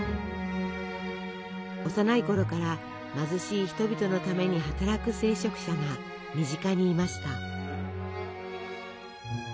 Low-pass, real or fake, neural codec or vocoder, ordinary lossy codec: none; real; none; none